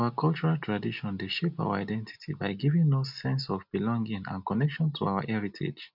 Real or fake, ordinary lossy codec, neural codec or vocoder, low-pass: real; none; none; 5.4 kHz